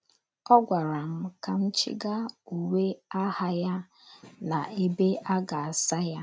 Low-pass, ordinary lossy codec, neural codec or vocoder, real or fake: none; none; none; real